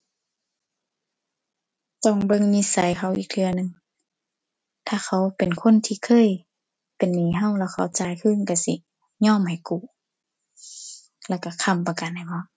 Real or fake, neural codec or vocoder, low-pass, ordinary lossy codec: real; none; none; none